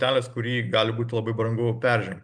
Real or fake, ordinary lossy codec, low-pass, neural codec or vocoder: real; MP3, 96 kbps; 9.9 kHz; none